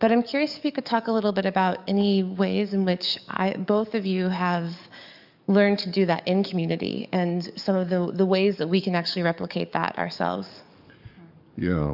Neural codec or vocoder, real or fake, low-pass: codec, 44.1 kHz, 7.8 kbps, DAC; fake; 5.4 kHz